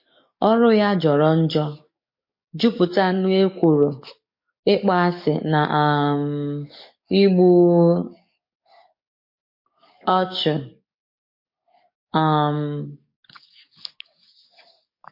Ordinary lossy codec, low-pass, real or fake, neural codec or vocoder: MP3, 32 kbps; 5.4 kHz; fake; codec, 44.1 kHz, 7.8 kbps, DAC